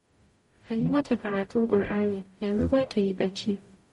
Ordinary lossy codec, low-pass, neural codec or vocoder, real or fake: MP3, 48 kbps; 19.8 kHz; codec, 44.1 kHz, 0.9 kbps, DAC; fake